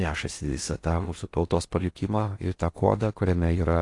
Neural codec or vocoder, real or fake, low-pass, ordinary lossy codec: codec, 16 kHz in and 24 kHz out, 0.6 kbps, FocalCodec, streaming, 4096 codes; fake; 10.8 kHz; AAC, 48 kbps